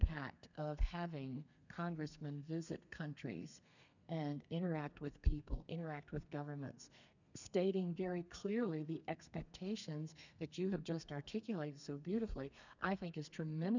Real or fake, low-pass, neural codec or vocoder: fake; 7.2 kHz; codec, 32 kHz, 1.9 kbps, SNAC